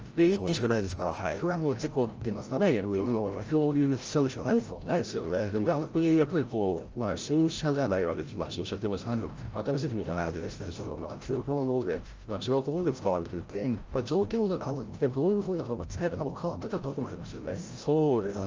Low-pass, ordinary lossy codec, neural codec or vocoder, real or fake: 7.2 kHz; Opus, 24 kbps; codec, 16 kHz, 0.5 kbps, FreqCodec, larger model; fake